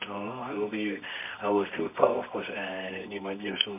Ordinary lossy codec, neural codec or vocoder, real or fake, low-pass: MP3, 32 kbps; codec, 24 kHz, 0.9 kbps, WavTokenizer, medium music audio release; fake; 3.6 kHz